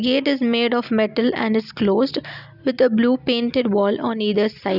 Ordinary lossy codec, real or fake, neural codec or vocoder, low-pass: none; real; none; 5.4 kHz